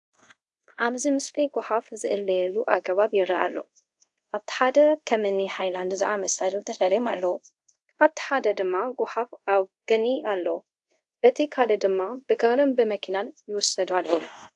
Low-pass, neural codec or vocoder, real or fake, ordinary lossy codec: 10.8 kHz; codec, 24 kHz, 0.5 kbps, DualCodec; fake; AAC, 64 kbps